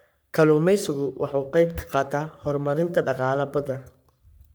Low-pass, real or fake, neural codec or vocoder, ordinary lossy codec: none; fake; codec, 44.1 kHz, 3.4 kbps, Pupu-Codec; none